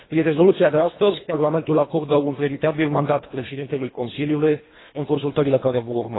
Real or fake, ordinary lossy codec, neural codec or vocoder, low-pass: fake; AAC, 16 kbps; codec, 24 kHz, 1.5 kbps, HILCodec; 7.2 kHz